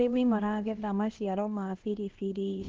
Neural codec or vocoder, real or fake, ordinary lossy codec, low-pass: codec, 16 kHz, 1 kbps, X-Codec, HuBERT features, trained on LibriSpeech; fake; Opus, 16 kbps; 7.2 kHz